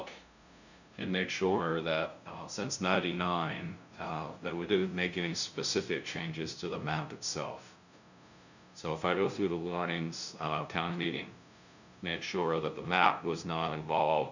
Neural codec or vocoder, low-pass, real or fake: codec, 16 kHz, 0.5 kbps, FunCodec, trained on LibriTTS, 25 frames a second; 7.2 kHz; fake